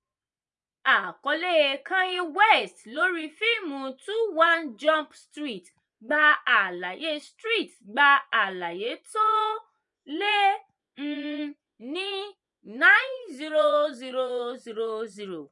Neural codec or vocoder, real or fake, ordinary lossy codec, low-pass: vocoder, 24 kHz, 100 mel bands, Vocos; fake; none; 10.8 kHz